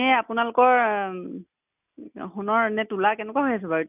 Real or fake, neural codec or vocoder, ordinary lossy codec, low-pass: real; none; none; 3.6 kHz